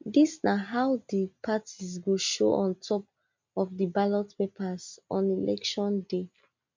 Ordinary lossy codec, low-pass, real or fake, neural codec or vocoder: MP3, 48 kbps; 7.2 kHz; real; none